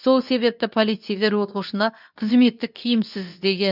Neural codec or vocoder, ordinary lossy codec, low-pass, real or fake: codec, 24 kHz, 0.9 kbps, WavTokenizer, medium speech release version 2; none; 5.4 kHz; fake